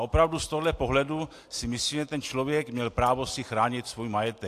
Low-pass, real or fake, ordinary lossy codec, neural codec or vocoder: 14.4 kHz; real; AAC, 64 kbps; none